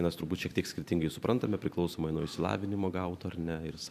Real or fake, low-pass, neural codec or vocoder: fake; 14.4 kHz; vocoder, 44.1 kHz, 128 mel bands every 512 samples, BigVGAN v2